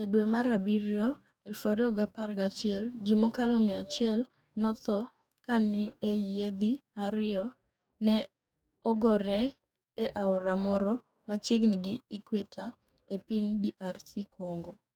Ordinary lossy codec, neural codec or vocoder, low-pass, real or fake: none; codec, 44.1 kHz, 2.6 kbps, DAC; 19.8 kHz; fake